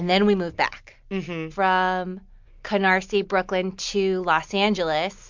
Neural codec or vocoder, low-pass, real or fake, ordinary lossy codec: none; 7.2 kHz; real; MP3, 64 kbps